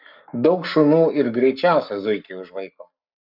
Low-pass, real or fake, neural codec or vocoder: 5.4 kHz; fake; codec, 44.1 kHz, 7.8 kbps, Pupu-Codec